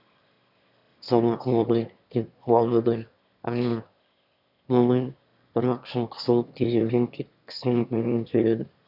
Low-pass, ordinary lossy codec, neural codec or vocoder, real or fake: 5.4 kHz; none; autoencoder, 22.05 kHz, a latent of 192 numbers a frame, VITS, trained on one speaker; fake